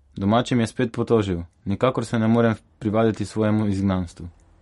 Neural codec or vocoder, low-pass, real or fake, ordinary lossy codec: none; 19.8 kHz; real; MP3, 48 kbps